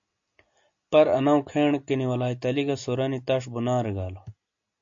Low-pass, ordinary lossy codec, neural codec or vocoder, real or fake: 7.2 kHz; AAC, 64 kbps; none; real